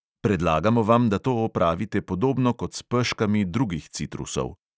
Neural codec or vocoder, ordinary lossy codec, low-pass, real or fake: none; none; none; real